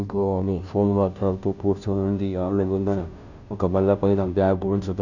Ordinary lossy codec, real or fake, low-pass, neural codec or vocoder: none; fake; 7.2 kHz; codec, 16 kHz, 0.5 kbps, FunCodec, trained on Chinese and English, 25 frames a second